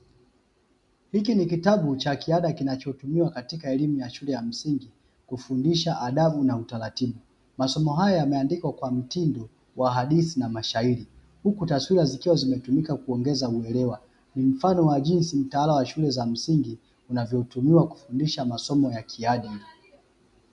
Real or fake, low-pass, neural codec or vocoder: real; 10.8 kHz; none